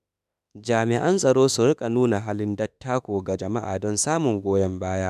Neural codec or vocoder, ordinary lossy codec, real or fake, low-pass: autoencoder, 48 kHz, 32 numbers a frame, DAC-VAE, trained on Japanese speech; none; fake; 14.4 kHz